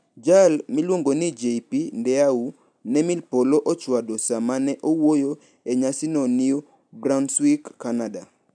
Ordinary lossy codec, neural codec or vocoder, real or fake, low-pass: none; none; real; 9.9 kHz